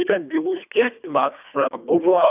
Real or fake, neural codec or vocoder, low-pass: fake; codec, 24 kHz, 1.5 kbps, HILCodec; 3.6 kHz